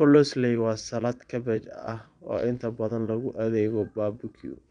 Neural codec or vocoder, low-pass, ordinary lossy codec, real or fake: vocoder, 22.05 kHz, 80 mel bands, Vocos; 9.9 kHz; MP3, 96 kbps; fake